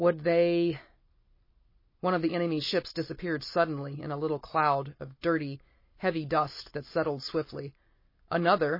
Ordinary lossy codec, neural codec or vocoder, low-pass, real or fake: MP3, 24 kbps; none; 5.4 kHz; real